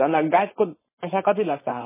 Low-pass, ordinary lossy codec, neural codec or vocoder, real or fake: 3.6 kHz; MP3, 16 kbps; codec, 16 kHz, 4.8 kbps, FACodec; fake